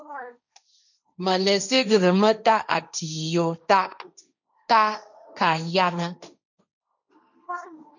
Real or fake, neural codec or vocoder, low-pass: fake; codec, 16 kHz, 1.1 kbps, Voila-Tokenizer; 7.2 kHz